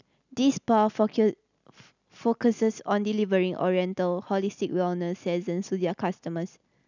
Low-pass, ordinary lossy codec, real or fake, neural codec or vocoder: 7.2 kHz; none; real; none